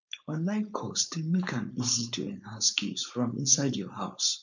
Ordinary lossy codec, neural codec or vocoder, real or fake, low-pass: none; codec, 16 kHz, 4.8 kbps, FACodec; fake; 7.2 kHz